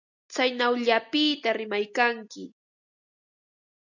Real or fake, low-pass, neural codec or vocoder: real; 7.2 kHz; none